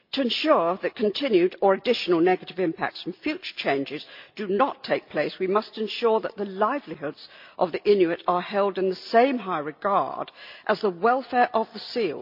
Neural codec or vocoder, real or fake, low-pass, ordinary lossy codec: none; real; 5.4 kHz; MP3, 48 kbps